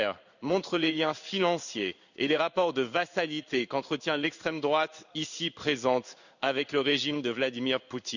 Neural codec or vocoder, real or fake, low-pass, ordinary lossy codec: codec, 16 kHz in and 24 kHz out, 1 kbps, XY-Tokenizer; fake; 7.2 kHz; none